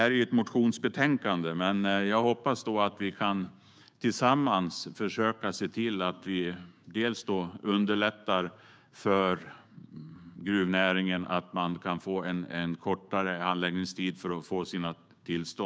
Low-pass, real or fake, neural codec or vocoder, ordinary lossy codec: none; fake; codec, 16 kHz, 6 kbps, DAC; none